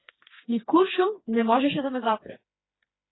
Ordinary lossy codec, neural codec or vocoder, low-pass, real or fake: AAC, 16 kbps; codec, 16 kHz, 2 kbps, FreqCodec, smaller model; 7.2 kHz; fake